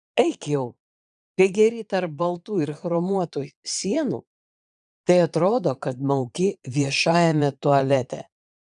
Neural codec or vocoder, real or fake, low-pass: vocoder, 22.05 kHz, 80 mel bands, Vocos; fake; 9.9 kHz